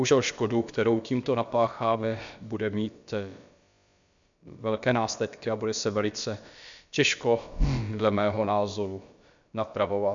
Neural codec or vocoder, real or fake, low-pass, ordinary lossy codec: codec, 16 kHz, about 1 kbps, DyCAST, with the encoder's durations; fake; 7.2 kHz; MP3, 64 kbps